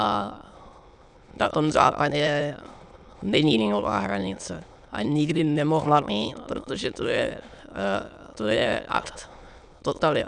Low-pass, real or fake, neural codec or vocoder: 9.9 kHz; fake; autoencoder, 22.05 kHz, a latent of 192 numbers a frame, VITS, trained on many speakers